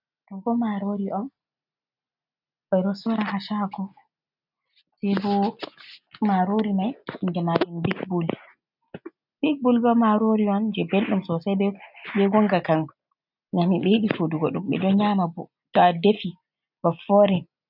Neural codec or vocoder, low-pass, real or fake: none; 5.4 kHz; real